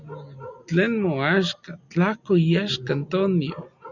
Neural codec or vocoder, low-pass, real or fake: none; 7.2 kHz; real